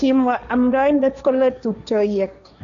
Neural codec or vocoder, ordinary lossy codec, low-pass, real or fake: codec, 16 kHz, 1.1 kbps, Voila-Tokenizer; none; 7.2 kHz; fake